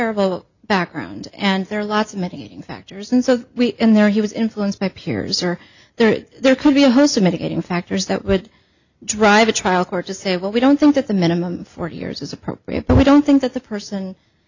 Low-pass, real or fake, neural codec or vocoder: 7.2 kHz; real; none